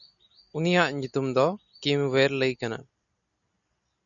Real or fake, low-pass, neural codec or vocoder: real; 7.2 kHz; none